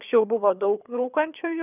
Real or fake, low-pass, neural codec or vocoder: fake; 3.6 kHz; codec, 24 kHz, 6 kbps, HILCodec